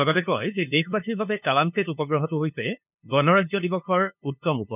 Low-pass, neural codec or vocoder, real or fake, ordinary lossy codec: 3.6 kHz; codec, 16 kHz, 2 kbps, FunCodec, trained on LibriTTS, 25 frames a second; fake; none